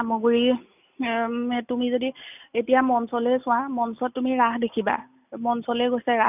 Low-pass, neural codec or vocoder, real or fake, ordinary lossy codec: 3.6 kHz; none; real; none